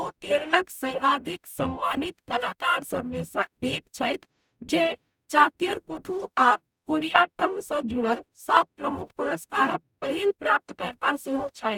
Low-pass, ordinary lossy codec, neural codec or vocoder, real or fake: 19.8 kHz; none; codec, 44.1 kHz, 0.9 kbps, DAC; fake